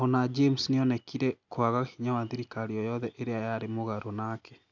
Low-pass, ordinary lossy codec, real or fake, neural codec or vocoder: 7.2 kHz; none; real; none